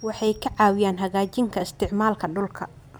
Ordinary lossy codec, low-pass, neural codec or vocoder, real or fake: none; none; none; real